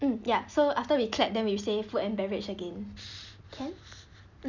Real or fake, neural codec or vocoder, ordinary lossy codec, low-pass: real; none; none; 7.2 kHz